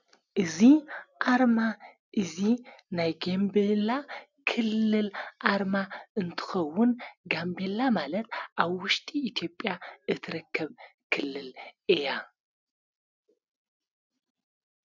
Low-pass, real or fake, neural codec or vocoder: 7.2 kHz; real; none